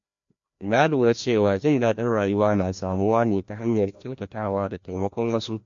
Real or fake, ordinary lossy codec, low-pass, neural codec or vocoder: fake; MP3, 48 kbps; 7.2 kHz; codec, 16 kHz, 1 kbps, FreqCodec, larger model